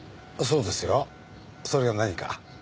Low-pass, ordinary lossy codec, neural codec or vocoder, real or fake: none; none; none; real